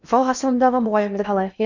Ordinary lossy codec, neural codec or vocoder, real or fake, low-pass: none; codec, 16 kHz in and 24 kHz out, 0.8 kbps, FocalCodec, streaming, 65536 codes; fake; 7.2 kHz